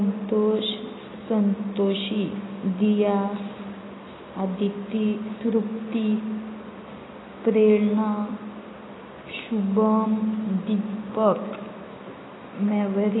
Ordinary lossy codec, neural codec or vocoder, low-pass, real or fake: AAC, 16 kbps; none; 7.2 kHz; real